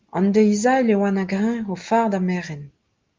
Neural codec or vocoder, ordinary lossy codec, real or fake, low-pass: none; Opus, 32 kbps; real; 7.2 kHz